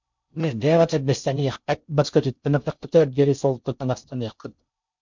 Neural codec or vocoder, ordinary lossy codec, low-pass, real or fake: codec, 16 kHz in and 24 kHz out, 0.6 kbps, FocalCodec, streaming, 4096 codes; MP3, 64 kbps; 7.2 kHz; fake